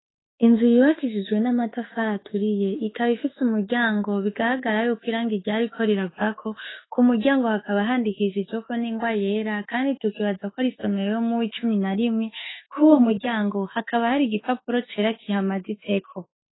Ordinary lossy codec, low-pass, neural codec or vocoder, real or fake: AAC, 16 kbps; 7.2 kHz; autoencoder, 48 kHz, 32 numbers a frame, DAC-VAE, trained on Japanese speech; fake